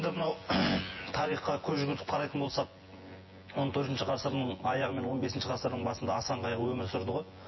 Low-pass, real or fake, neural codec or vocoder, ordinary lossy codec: 7.2 kHz; fake; vocoder, 24 kHz, 100 mel bands, Vocos; MP3, 24 kbps